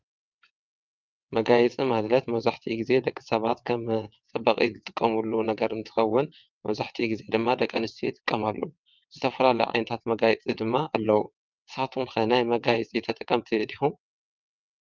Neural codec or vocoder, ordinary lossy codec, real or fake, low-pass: vocoder, 22.05 kHz, 80 mel bands, WaveNeXt; Opus, 24 kbps; fake; 7.2 kHz